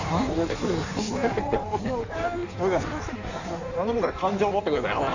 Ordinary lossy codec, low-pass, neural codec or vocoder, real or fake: none; 7.2 kHz; codec, 16 kHz in and 24 kHz out, 1.1 kbps, FireRedTTS-2 codec; fake